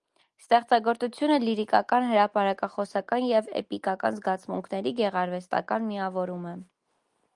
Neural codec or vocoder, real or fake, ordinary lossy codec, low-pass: none; real; Opus, 32 kbps; 10.8 kHz